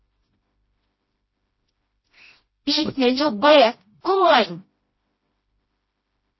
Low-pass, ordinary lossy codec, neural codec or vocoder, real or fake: 7.2 kHz; MP3, 24 kbps; codec, 16 kHz, 1 kbps, FreqCodec, smaller model; fake